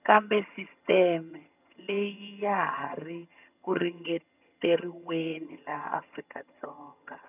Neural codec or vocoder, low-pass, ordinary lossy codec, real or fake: vocoder, 22.05 kHz, 80 mel bands, HiFi-GAN; 3.6 kHz; none; fake